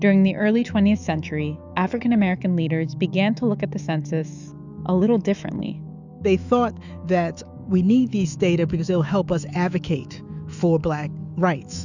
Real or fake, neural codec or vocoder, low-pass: fake; autoencoder, 48 kHz, 128 numbers a frame, DAC-VAE, trained on Japanese speech; 7.2 kHz